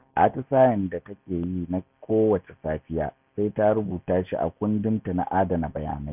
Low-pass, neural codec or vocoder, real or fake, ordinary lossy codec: 3.6 kHz; none; real; none